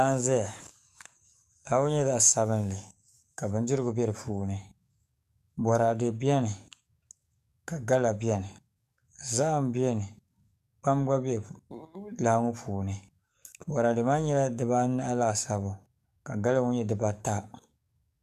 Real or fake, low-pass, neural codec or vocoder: fake; 14.4 kHz; codec, 44.1 kHz, 7.8 kbps, DAC